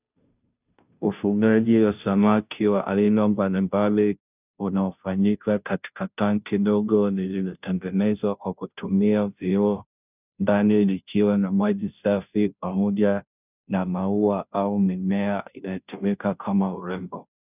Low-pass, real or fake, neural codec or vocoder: 3.6 kHz; fake; codec, 16 kHz, 0.5 kbps, FunCodec, trained on Chinese and English, 25 frames a second